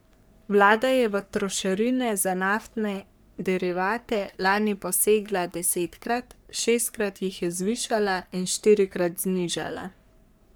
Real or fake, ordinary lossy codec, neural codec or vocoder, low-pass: fake; none; codec, 44.1 kHz, 3.4 kbps, Pupu-Codec; none